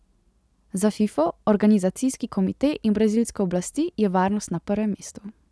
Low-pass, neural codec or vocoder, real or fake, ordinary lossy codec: none; none; real; none